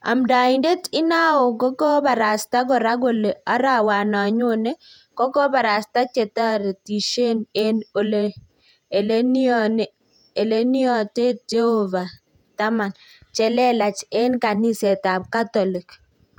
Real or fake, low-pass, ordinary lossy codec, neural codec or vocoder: fake; 19.8 kHz; none; vocoder, 48 kHz, 128 mel bands, Vocos